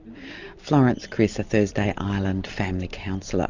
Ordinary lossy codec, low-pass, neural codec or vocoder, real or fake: Opus, 64 kbps; 7.2 kHz; none; real